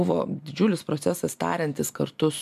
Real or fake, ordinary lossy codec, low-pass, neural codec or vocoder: real; AAC, 96 kbps; 14.4 kHz; none